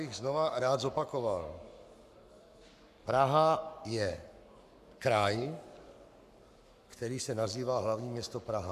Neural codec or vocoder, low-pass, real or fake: codec, 44.1 kHz, 7.8 kbps, Pupu-Codec; 14.4 kHz; fake